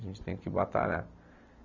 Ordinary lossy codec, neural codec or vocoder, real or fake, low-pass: none; none; real; 7.2 kHz